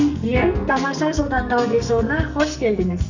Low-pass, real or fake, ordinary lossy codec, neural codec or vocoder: 7.2 kHz; fake; none; codec, 44.1 kHz, 2.6 kbps, SNAC